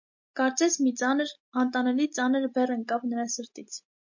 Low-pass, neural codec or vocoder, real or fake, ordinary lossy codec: 7.2 kHz; none; real; MP3, 48 kbps